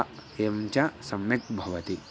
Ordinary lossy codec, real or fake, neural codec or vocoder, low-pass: none; real; none; none